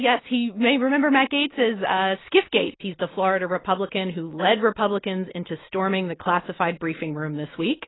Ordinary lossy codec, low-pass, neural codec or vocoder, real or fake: AAC, 16 kbps; 7.2 kHz; none; real